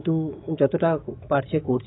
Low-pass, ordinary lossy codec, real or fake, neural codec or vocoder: 7.2 kHz; AAC, 16 kbps; fake; autoencoder, 48 kHz, 128 numbers a frame, DAC-VAE, trained on Japanese speech